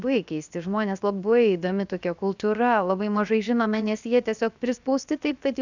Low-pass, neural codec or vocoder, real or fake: 7.2 kHz; codec, 16 kHz, 0.7 kbps, FocalCodec; fake